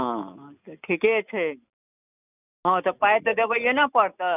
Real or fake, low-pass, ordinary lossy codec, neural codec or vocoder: real; 3.6 kHz; none; none